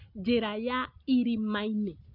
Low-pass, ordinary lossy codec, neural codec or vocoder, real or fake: 5.4 kHz; none; none; real